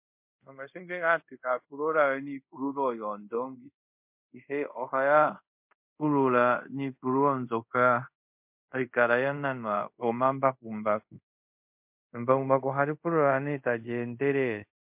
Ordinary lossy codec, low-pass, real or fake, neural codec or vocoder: MP3, 32 kbps; 3.6 kHz; fake; codec, 24 kHz, 0.5 kbps, DualCodec